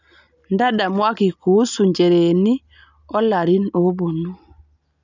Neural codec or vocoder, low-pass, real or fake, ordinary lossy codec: none; 7.2 kHz; real; none